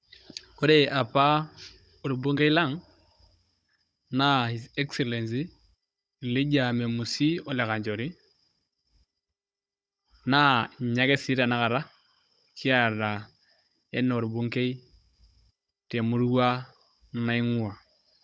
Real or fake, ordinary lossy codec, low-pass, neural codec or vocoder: fake; none; none; codec, 16 kHz, 16 kbps, FunCodec, trained on Chinese and English, 50 frames a second